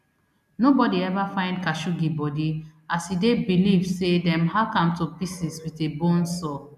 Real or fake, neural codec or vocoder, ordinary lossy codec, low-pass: real; none; none; 14.4 kHz